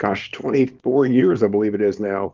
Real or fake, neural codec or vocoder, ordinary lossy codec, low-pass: fake; codec, 24 kHz, 0.9 kbps, WavTokenizer, small release; Opus, 32 kbps; 7.2 kHz